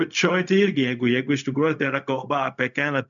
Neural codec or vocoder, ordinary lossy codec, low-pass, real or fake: codec, 16 kHz, 0.4 kbps, LongCat-Audio-Codec; MP3, 96 kbps; 7.2 kHz; fake